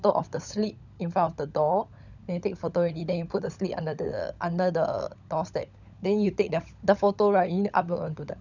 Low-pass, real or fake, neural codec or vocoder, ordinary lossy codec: 7.2 kHz; fake; codec, 16 kHz, 16 kbps, FunCodec, trained on LibriTTS, 50 frames a second; none